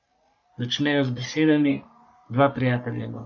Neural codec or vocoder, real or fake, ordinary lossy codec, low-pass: codec, 44.1 kHz, 3.4 kbps, Pupu-Codec; fake; none; 7.2 kHz